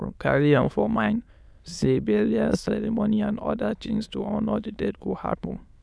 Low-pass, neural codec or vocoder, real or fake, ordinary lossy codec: none; autoencoder, 22.05 kHz, a latent of 192 numbers a frame, VITS, trained on many speakers; fake; none